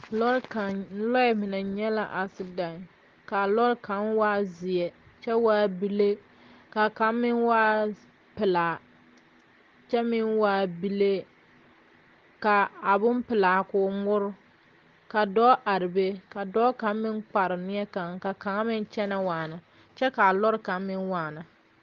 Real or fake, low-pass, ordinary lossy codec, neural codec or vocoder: real; 7.2 kHz; Opus, 16 kbps; none